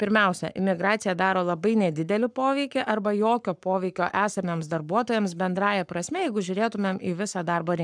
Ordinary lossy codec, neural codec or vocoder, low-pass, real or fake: MP3, 96 kbps; codec, 44.1 kHz, 7.8 kbps, Pupu-Codec; 9.9 kHz; fake